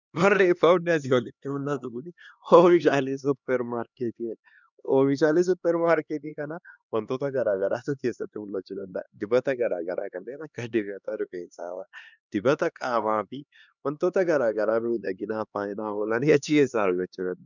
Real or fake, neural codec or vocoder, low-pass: fake; codec, 16 kHz, 2 kbps, X-Codec, HuBERT features, trained on LibriSpeech; 7.2 kHz